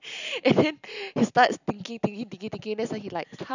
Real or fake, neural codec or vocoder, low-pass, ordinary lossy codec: real; none; 7.2 kHz; none